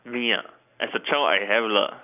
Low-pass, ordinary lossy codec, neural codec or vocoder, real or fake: 3.6 kHz; none; none; real